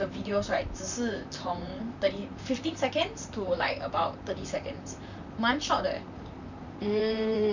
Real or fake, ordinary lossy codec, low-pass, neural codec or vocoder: fake; none; 7.2 kHz; vocoder, 44.1 kHz, 128 mel bands, Pupu-Vocoder